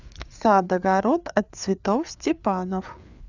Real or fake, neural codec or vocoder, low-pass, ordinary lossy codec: fake; codec, 16 kHz, 4 kbps, FreqCodec, larger model; 7.2 kHz; none